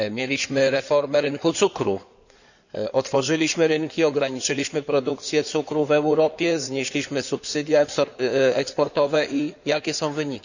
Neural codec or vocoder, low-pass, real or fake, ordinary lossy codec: codec, 16 kHz in and 24 kHz out, 2.2 kbps, FireRedTTS-2 codec; 7.2 kHz; fake; none